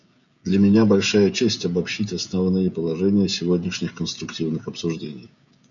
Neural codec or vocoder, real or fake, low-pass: codec, 16 kHz, 16 kbps, FreqCodec, smaller model; fake; 7.2 kHz